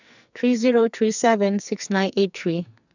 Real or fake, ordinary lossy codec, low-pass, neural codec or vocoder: fake; none; 7.2 kHz; codec, 44.1 kHz, 2.6 kbps, SNAC